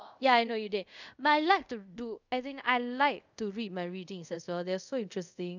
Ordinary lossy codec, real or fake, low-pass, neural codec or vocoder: none; fake; 7.2 kHz; codec, 24 kHz, 0.5 kbps, DualCodec